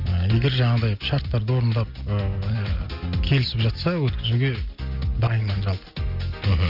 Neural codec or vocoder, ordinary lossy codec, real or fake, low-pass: none; Opus, 24 kbps; real; 5.4 kHz